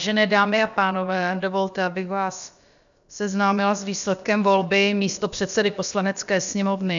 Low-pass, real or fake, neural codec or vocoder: 7.2 kHz; fake; codec, 16 kHz, about 1 kbps, DyCAST, with the encoder's durations